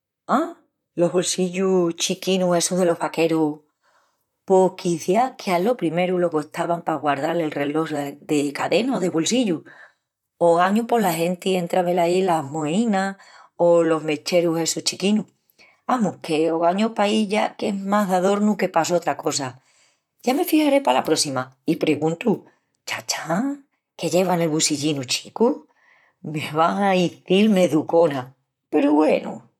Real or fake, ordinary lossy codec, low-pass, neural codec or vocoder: fake; none; 19.8 kHz; vocoder, 44.1 kHz, 128 mel bands, Pupu-Vocoder